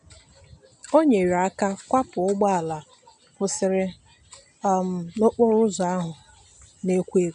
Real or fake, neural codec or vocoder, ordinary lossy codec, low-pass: real; none; none; 9.9 kHz